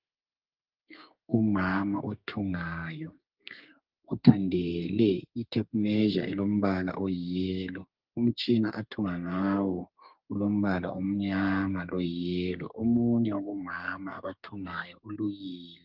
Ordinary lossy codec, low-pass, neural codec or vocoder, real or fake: Opus, 32 kbps; 5.4 kHz; codec, 32 kHz, 1.9 kbps, SNAC; fake